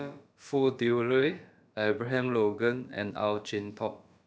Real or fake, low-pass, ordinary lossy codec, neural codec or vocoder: fake; none; none; codec, 16 kHz, about 1 kbps, DyCAST, with the encoder's durations